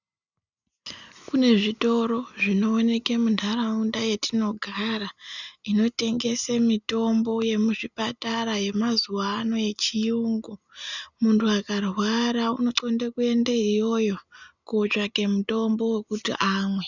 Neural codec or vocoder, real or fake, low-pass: none; real; 7.2 kHz